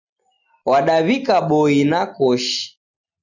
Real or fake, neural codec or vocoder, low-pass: real; none; 7.2 kHz